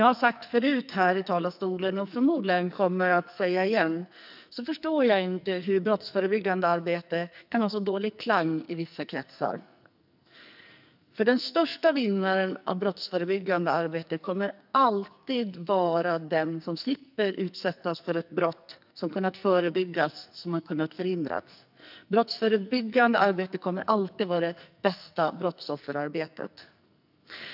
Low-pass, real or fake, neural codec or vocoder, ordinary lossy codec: 5.4 kHz; fake; codec, 44.1 kHz, 2.6 kbps, SNAC; none